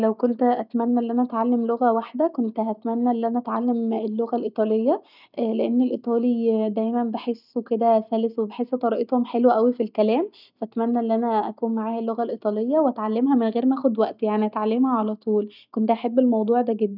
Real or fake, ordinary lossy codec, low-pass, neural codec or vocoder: real; none; 5.4 kHz; none